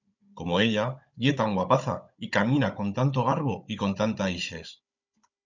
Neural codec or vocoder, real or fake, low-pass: codec, 16 kHz, 16 kbps, FunCodec, trained on Chinese and English, 50 frames a second; fake; 7.2 kHz